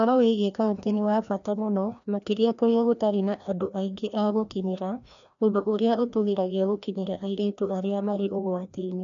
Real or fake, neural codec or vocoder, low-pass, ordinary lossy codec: fake; codec, 16 kHz, 1 kbps, FreqCodec, larger model; 7.2 kHz; none